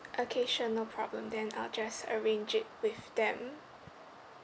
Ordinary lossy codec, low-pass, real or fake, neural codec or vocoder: none; none; real; none